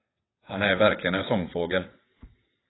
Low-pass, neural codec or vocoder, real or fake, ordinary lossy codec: 7.2 kHz; vocoder, 22.05 kHz, 80 mel bands, WaveNeXt; fake; AAC, 16 kbps